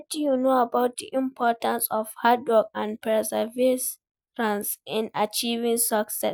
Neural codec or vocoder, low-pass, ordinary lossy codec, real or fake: none; none; none; real